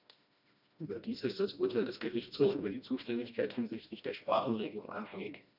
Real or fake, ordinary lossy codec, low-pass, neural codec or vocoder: fake; none; 5.4 kHz; codec, 16 kHz, 1 kbps, FreqCodec, smaller model